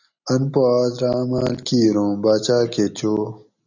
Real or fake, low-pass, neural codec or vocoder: real; 7.2 kHz; none